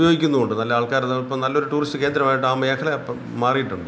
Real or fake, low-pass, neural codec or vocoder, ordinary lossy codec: real; none; none; none